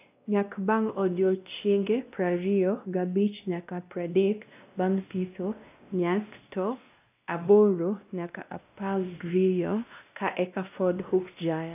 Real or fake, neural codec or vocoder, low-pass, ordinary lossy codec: fake; codec, 16 kHz, 1 kbps, X-Codec, WavLM features, trained on Multilingual LibriSpeech; 3.6 kHz; AAC, 32 kbps